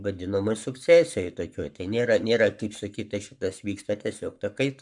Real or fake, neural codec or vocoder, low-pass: fake; codec, 44.1 kHz, 7.8 kbps, Pupu-Codec; 10.8 kHz